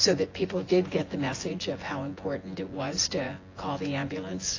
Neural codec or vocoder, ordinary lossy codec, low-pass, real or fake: vocoder, 24 kHz, 100 mel bands, Vocos; AAC, 32 kbps; 7.2 kHz; fake